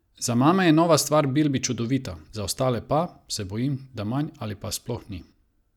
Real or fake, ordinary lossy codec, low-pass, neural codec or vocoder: real; none; 19.8 kHz; none